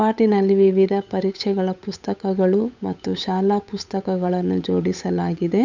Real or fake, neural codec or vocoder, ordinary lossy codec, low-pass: fake; codec, 16 kHz, 8 kbps, FunCodec, trained on Chinese and English, 25 frames a second; none; 7.2 kHz